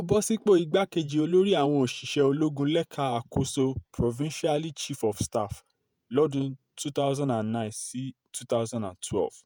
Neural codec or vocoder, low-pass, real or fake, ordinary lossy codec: vocoder, 48 kHz, 128 mel bands, Vocos; none; fake; none